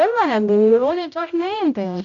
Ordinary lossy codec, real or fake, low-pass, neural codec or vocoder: none; fake; 7.2 kHz; codec, 16 kHz, 0.5 kbps, X-Codec, HuBERT features, trained on general audio